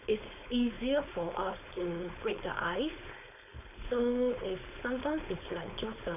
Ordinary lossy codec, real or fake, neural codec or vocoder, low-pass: none; fake; codec, 16 kHz, 4.8 kbps, FACodec; 3.6 kHz